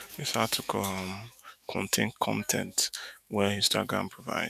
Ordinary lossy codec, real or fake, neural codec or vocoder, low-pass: none; fake; autoencoder, 48 kHz, 128 numbers a frame, DAC-VAE, trained on Japanese speech; 14.4 kHz